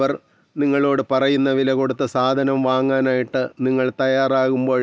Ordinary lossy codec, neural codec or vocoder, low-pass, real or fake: none; none; none; real